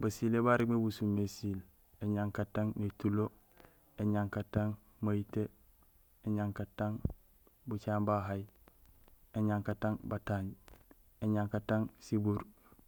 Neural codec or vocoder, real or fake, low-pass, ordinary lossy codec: none; real; none; none